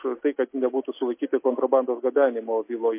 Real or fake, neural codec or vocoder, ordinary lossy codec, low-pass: real; none; MP3, 24 kbps; 3.6 kHz